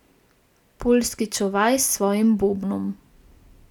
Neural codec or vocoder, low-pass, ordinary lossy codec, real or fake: none; 19.8 kHz; none; real